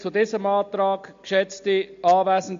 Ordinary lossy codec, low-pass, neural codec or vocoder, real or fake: MP3, 48 kbps; 7.2 kHz; none; real